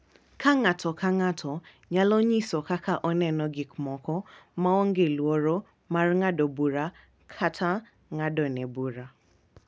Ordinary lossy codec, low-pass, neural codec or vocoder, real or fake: none; none; none; real